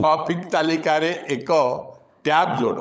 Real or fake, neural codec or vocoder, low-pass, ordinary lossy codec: fake; codec, 16 kHz, 16 kbps, FunCodec, trained on LibriTTS, 50 frames a second; none; none